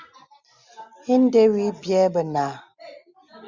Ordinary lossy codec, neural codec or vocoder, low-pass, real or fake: Opus, 64 kbps; none; 7.2 kHz; real